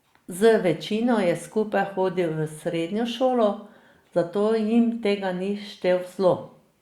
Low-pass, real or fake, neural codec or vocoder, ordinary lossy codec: 19.8 kHz; real; none; Opus, 64 kbps